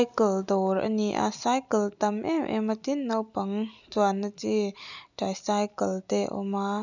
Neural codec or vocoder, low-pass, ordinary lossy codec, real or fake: none; 7.2 kHz; none; real